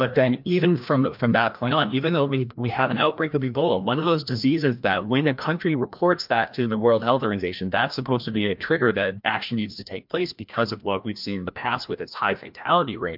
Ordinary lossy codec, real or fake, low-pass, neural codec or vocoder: MP3, 48 kbps; fake; 5.4 kHz; codec, 16 kHz, 1 kbps, FreqCodec, larger model